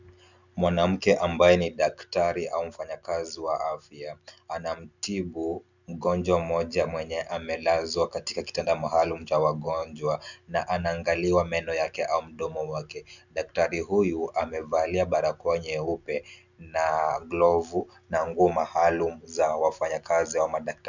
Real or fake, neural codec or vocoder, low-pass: real; none; 7.2 kHz